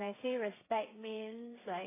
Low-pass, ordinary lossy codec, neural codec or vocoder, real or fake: 3.6 kHz; AAC, 16 kbps; codec, 16 kHz, 8 kbps, FreqCodec, smaller model; fake